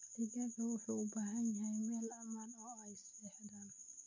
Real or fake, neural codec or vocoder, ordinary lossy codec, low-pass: real; none; none; 7.2 kHz